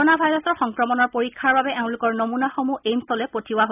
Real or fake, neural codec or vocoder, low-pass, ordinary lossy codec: real; none; 3.6 kHz; none